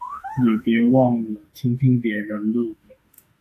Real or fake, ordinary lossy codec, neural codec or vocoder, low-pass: fake; AAC, 64 kbps; autoencoder, 48 kHz, 32 numbers a frame, DAC-VAE, trained on Japanese speech; 14.4 kHz